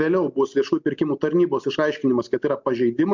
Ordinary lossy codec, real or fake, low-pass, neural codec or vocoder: MP3, 64 kbps; real; 7.2 kHz; none